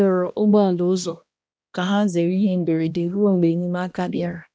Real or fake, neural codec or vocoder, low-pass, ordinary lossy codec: fake; codec, 16 kHz, 1 kbps, X-Codec, HuBERT features, trained on balanced general audio; none; none